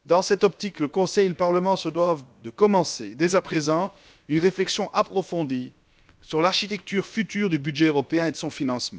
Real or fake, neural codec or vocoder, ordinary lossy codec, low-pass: fake; codec, 16 kHz, about 1 kbps, DyCAST, with the encoder's durations; none; none